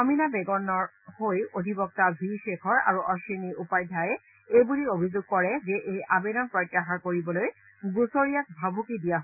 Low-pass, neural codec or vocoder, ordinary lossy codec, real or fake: 3.6 kHz; none; none; real